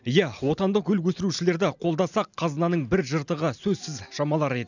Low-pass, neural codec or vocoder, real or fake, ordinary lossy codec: 7.2 kHz; none; real; none